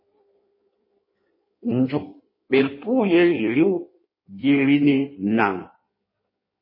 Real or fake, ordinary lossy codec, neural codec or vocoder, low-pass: fake; MP3, 24 kbps; codec, 16 kHz in and 24 kHz out, 1.1 kbps, FireRedTTS-2 codec; 5.4 kHz